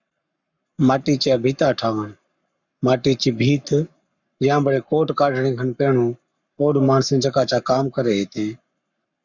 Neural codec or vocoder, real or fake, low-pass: codec, 44.1 kHz, 7.8 kbps, Pupu-Codec; fake; 7.2 kHz